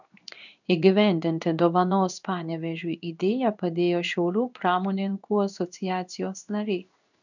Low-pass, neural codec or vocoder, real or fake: 7.2 kHz; codec, 16 kHz in and 24 kHz out, 1 kbps, XY-Tokenizer; fake